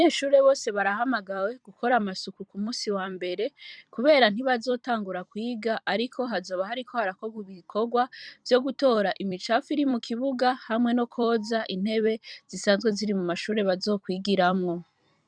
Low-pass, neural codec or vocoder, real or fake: 9.9 kHz; vocoder, 44.1 kHz, 128 mel bands every 256 samples, BigVGAN v2; fake